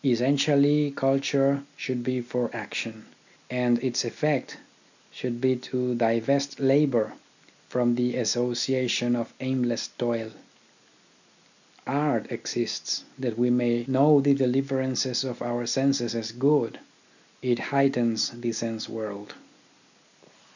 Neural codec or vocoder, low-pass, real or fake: none; 7.2 kHz; real